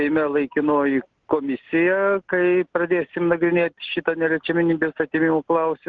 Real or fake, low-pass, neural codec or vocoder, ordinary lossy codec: real; 7.2 kHz; none; Opus, 16 kbps